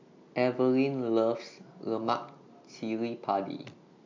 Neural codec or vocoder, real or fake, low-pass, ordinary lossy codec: none; real; 7.2 kHz; MP3, 64 kbps